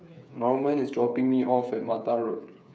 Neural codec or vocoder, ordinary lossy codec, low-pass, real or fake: codec, 16 kHz, 8 kbps, FreqCodec, smaller model; none; none; fake